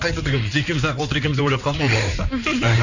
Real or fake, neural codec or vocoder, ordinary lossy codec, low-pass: fake; codec, 16 kHz in and 24 kHz out, 2.2 kbps, FireRedTTS-2 codec; none; 7.2 kHz